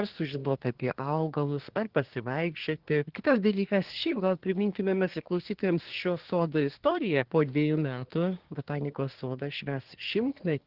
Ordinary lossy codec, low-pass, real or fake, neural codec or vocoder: Opus, 16 kbps; 5.4 kHz; fake; codec, 24 kHz, 1 kbps, SNAC